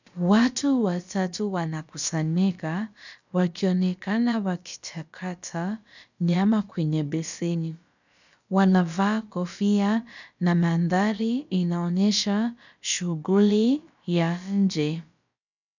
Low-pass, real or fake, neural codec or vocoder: 7.2 kHz; fake; codec, 16 kHz, about 1 kbps, DyCAST, with the encoder's durations